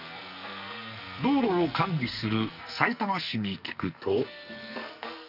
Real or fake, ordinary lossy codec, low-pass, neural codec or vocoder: fake; none; 5.4 kHz; codec, 44.1 kHz, 2.6 kbps, SNAC